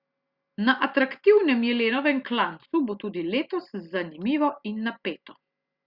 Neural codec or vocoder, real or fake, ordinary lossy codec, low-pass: none; real; Opus, 64 kbps; 5.4 kHz